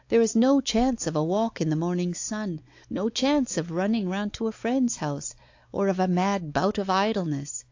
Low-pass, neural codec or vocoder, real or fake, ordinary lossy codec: 7.2 kHz; codec, 16 kHz, 4 kbps, X-Codec, WavLM features, trained on Multilingual LibriSpeech; fake; AAC, 48 kbps